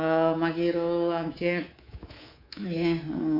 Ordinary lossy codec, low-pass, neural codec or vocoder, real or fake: none; 5.4 kHz; codec, 24 kHz, 3.1 kbps, DualCodec; fake